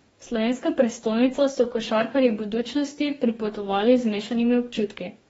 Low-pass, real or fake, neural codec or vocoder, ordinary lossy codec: 19.8 kHz; fake; codec, 44.1 kHz, 2.6 kbps, DAC; AAC, 24 kbps